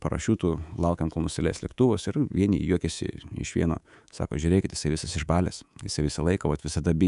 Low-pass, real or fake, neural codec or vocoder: 10.8 kHz; fake; codec, 24 kHz, 3.1 kbps, DualCodec